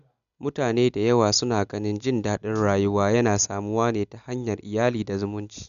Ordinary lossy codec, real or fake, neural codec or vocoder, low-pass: none; real; none; 7.2 kHz